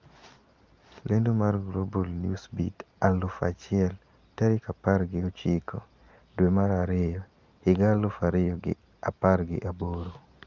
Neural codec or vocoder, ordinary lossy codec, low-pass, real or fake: none; Opus, 24 kbps; 7.2 kHz; real